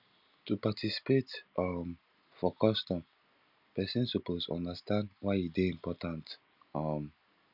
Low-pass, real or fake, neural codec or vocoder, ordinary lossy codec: 5.4 kHz; real; none; none